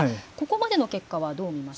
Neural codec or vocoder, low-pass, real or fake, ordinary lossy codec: none; none; real; none